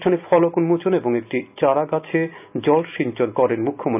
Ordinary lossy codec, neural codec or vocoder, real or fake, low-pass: none; none; real; 3.6 kHz